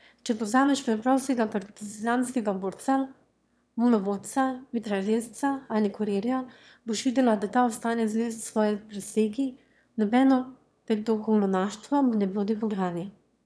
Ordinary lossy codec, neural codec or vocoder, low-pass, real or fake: none; autoencoder, 22.05 kHz, a latent of 192 numbers a frame, VITS, trained on one speaker; none; fake